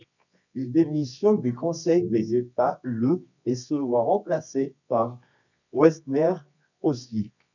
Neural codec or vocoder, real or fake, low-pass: codec, 24 kHz, 0.9 kbps, WavTokenizer, medium music audio release; fake; 7.2 kHz